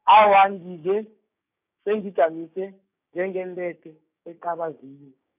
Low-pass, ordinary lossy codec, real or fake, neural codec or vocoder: 3.6 kHz; none; fake; codec, 16 kHz, 6 kbps, DAC